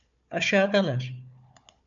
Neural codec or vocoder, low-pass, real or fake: codec, 16 kHz, 4 kbps, FunCodec, trained on Chinese and English, 50 frames a second; 7.2 kHz; fake